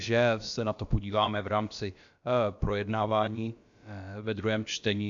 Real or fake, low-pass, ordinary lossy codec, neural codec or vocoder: fake; 7.2 kHz; AAC, 64 kbps; codec, 16 kHz, about 1 kbps, DyCAST, with the encoder's durations